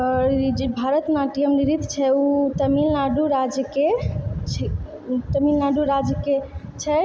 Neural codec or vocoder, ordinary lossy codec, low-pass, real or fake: none; none; none; real